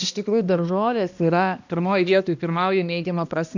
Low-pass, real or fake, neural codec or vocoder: 7.2 kHz; fake; codec, 16 kHz, 1 kbps, X-Codec, HuBERT features, trained on balanced general audio